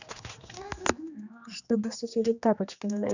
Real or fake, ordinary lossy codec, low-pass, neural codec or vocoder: fake; none; 7.2 kHz; codec, 16 kHz, 2 kbps, X-Codec, HuBERT features, trained on general audio